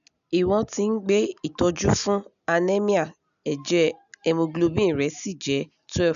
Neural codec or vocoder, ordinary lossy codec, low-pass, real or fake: none; none; 7.2 kHz; real